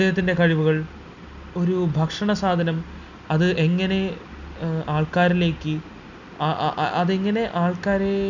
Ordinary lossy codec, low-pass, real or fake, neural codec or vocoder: none; 7.2 kHz; real; none